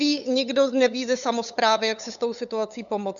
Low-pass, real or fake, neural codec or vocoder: 7.2 kHz; fake; codec, 16 kHz, 8 kbps, FunCodec, trained on LibriTTS, 25 frames a second